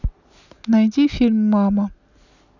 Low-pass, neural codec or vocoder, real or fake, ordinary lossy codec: 7.2 kHz; none; real; none